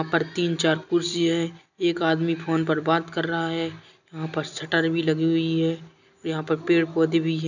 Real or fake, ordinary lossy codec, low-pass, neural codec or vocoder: real; none; 7.2 kHz; none